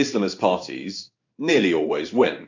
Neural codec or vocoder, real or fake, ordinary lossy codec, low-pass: codec, 16 kHz in and 24 kHz out, 1 kbps, XY-Tokenizer; fake; AAC, 48 kbps; 7.2 kHz